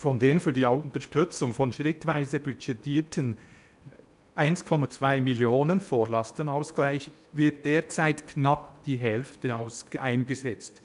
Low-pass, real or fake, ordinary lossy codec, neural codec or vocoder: 10.8 kHz; fake; none; codec, 16 kHz in and 24 kHz out, 0.8 kbps, FocalCodec, streaming, 65536 codes